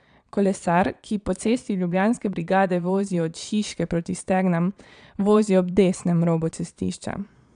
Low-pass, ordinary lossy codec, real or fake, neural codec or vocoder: 9.9 kHz; none; fake; vocoder, 22.05 kHz, 80 mel bands, Vocos